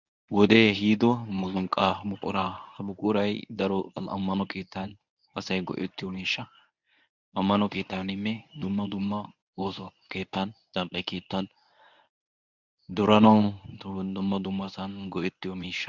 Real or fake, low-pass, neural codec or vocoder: fake; 7.2 kHz; codec, 24 kHz, 0.9 kbps, WavTokenizer, medium speech release version 1